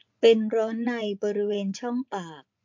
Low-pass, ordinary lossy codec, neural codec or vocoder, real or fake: 7.2 kHz; MP3, 48 kbps; vocoder, 24 kHz, 100 mel bands, Vocos; fake